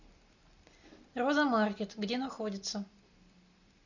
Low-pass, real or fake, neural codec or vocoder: 7.2 kHz; real; none